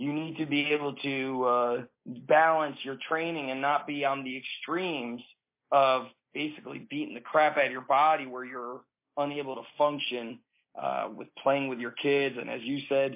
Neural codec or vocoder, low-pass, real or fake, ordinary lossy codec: none; 3.6 kHz; real; MP3, 24 kbps